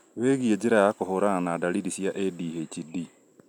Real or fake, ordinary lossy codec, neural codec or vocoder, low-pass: real; none; none; 19.8 kHz